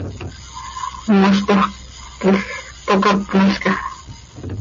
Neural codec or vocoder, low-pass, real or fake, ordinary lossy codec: none; 7.2 kHz; real; MP3, 32 kbps